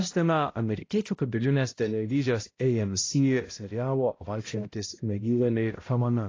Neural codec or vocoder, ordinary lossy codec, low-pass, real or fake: codec, 16 kHz, 0.5 kbps, X-Codec, HuBERT features, trained on balanced general audio; AAC, 32 kbps; 7.2 kHz; fake